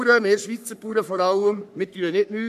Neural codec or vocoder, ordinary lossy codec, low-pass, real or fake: codec, 44.1 kHz, 3.4 kbps, Pupu-Codec; none; 14.4 kHz; fake